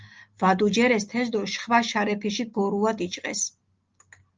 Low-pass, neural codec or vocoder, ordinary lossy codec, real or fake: 7.2 kHz; none; Opus, 24 kbps; real